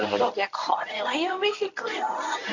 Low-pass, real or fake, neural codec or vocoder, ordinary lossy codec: 7.2 kHz; fake; codec, 24 kHz, 0.9 kbps, WavTokenizer, medium speech release version 1; none